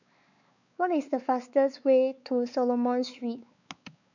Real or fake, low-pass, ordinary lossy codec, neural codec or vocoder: fake; 7.2 kHz; none; codec, 16 kHz, 4 kbps, X-Codec, WavLM features, trained on Multilingual LibriSpeech